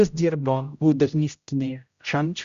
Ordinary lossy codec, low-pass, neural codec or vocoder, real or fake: Opus, 64 kbps; 7.2 kHz; codec, 16 kHz, 0.5 kbps, X-Codec, HuBERT features, trained on general audio; fake